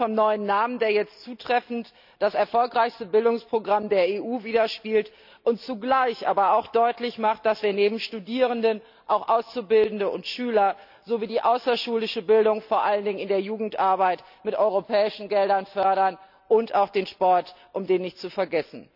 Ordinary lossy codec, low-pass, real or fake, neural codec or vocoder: none; 5.4 kHz; real; none